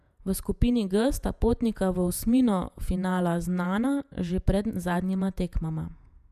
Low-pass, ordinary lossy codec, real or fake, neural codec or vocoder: 14.4 kHz; none; fake; vocoder, 48 kHz, 128 mel bands, Vocos